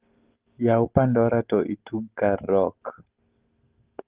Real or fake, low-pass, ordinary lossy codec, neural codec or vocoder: real; 3.6 kHz; Opus, 16 kbps; none